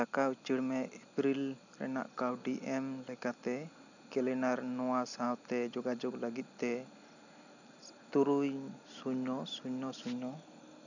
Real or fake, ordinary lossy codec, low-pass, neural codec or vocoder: real; none; 7.2 kHz; none